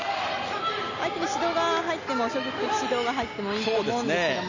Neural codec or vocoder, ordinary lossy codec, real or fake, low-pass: none; none; real; 7.2 kHz